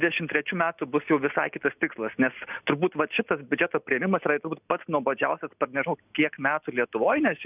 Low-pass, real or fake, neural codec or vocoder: 3.6 kHz; real; none